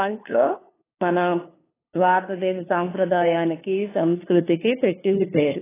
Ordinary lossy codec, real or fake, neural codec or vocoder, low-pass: AAC, 16 kbps; fake; codec, 16 kHz, 2 kbps, FunCodec, trained on LibriTTS, 25 frames a second; 3.6 kHz